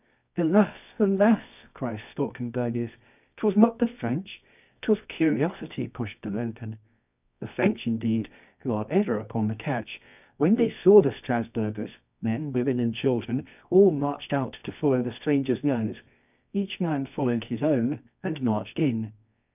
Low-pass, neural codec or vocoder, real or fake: 3.6 kHz; codec, 24 kHz, 0.9 kbps, WavTokenizer, medium music audio release; fake